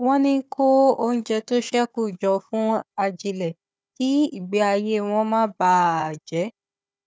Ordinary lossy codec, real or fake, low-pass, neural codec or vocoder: none; fake; none; codec, 16 kHz, 4 kbps, FunCodec, trained on Chinese and English, 50 frames a second